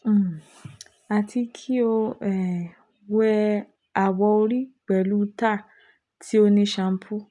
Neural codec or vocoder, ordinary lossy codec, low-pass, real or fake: none; none; 10.8 kHz; real